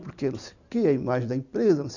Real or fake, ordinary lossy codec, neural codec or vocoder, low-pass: real; none; none; 7.2 kHz